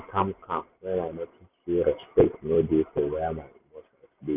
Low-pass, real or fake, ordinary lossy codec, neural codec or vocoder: 3.6 kHz; real; none; none